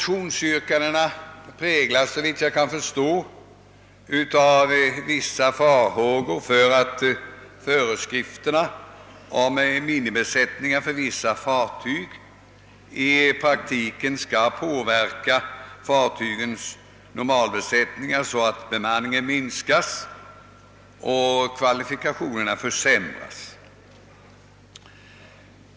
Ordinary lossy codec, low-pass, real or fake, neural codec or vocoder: none; none; real; none